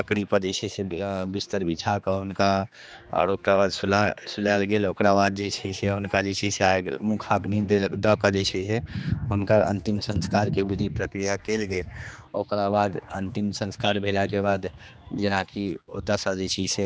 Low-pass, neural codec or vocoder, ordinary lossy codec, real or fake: none; codec, 16 kHz, 2 kbps, X-Codec, HuBERT features, trained on general audio; none; fake